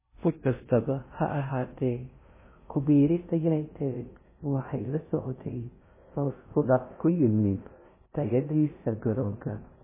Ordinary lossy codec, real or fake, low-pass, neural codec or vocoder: MP3, 16 kbps; fake; 3.6 kHz; codec, 16 kHz in and 24 kHz out, 0.6 kbps, FocalCodec, streaming, 2048 codes